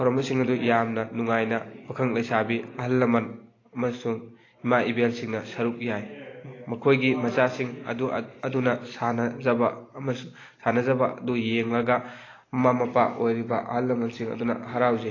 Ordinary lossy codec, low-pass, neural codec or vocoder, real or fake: AAC, 32 kbps; 7.2 kHz; none; real